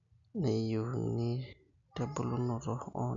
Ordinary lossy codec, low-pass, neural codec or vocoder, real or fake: none; 7.2 kHz; none; real